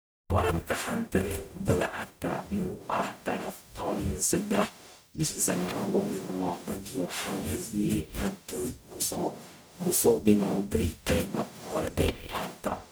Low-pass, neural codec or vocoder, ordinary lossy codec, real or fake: none; codec, 44.1 kHz, 0.9 kbps, DAC; none; fake